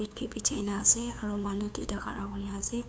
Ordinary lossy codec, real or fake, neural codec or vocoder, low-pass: none; fake; codec, 16 kHz, 2 kbps, FunCodec, trained on LibriTTS, 25 frames a second; none